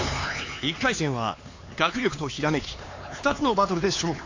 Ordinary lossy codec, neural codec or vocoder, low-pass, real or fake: AAC, 32 kbps; codec, 16 kHz, 4 kbps, X-Codec, HuBERT features, trained on LibriSpeech; 7.2 kHz; fake